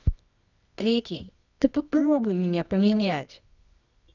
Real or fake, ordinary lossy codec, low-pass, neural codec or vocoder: fake; none; 7.2 kHz; codec, 24 kHz, 0.9 kbps, WavTokenizer, medium music audio release